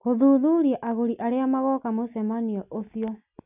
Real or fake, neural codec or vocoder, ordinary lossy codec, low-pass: real; none; none; 3.6 kHz